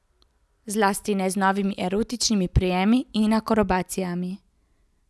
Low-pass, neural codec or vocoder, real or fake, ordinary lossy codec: none; none; real; none